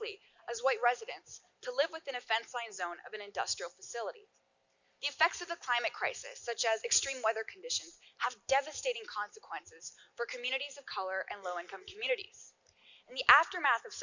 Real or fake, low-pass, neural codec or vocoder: real; 7.2 kHz; none